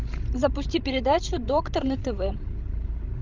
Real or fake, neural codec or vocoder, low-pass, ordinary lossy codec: fake; codec, 16 kHz, 16 kbps, FreqCodec, larger model; 7.2 kHz; Opus, 24 kbps